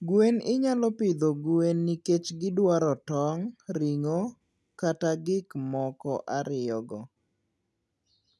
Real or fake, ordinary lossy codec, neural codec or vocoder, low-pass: real; none; none; none